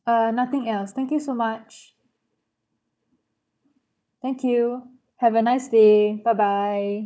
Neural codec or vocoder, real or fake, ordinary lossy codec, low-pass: codec, 16 kHz, 4 kbps, FunCodec, trained on Chinese and English, 50 frames a second; fake; none; none